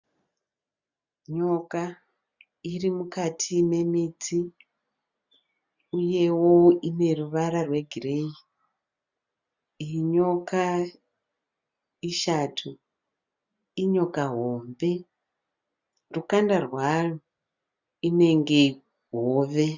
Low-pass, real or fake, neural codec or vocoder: 7.2 kHz; real; none